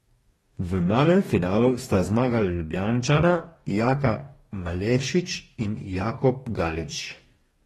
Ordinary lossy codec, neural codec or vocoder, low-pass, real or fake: AAC, 32 kbps; codec, 44.1 kHz, 2.6 kbps, DAC; 19.8 kHz; fake